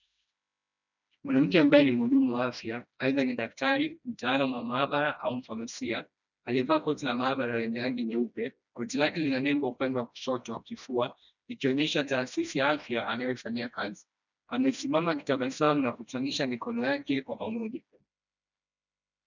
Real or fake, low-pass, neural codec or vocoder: fake; 7.2 kHz; codec, 16 kHz, 1 kbps, FreqCodec, smaller model